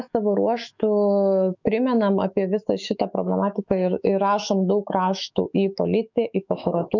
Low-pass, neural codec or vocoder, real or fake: 7.2 kHz; autoencoder, 48 kHz, 128 numbers a frame, DAC-VAE, trained on Japanese speech; fake